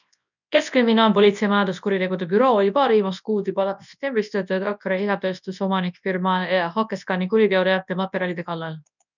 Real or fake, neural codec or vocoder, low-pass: fake; codec, 24 kHz, 0.9 kbps, WavTokenizer, large speech release; 7.2 kHz